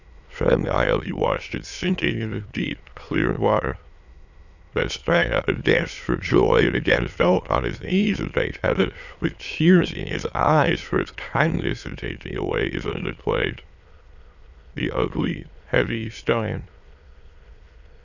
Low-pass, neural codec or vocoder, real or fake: 7.2 kHz; autoencoder, 22.05 kHz, a latent of 192 numbers a frame, VITS, trained on many speakers; fake